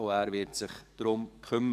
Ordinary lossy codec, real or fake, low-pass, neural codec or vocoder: none; fake; 14.4 kHz; codec, 44.1 kHz, 7.8 kbps, DAC